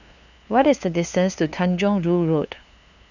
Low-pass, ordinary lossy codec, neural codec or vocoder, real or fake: 7.2 kHz; none; codec, 16 kHz, 2 kbps, FunCodec, trained on LibriTTS, 25 frames a second; fake